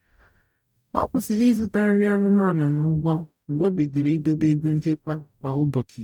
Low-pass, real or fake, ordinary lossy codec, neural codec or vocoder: 19.8 kHz; fake; none; codec, 44.1 kHz, 0.9 kbps, DAC